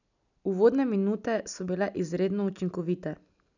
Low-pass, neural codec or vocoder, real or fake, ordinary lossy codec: 7.2 kHz; none; real; none